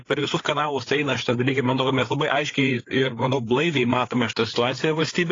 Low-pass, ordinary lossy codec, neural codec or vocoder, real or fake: 7.2 kHz; AAC, 32 kbps; codec, 16 kHz, 4 kbps, FreqCodec, larger model; fake